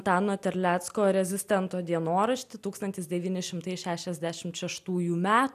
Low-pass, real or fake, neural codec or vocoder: 14.4 kHz; real; none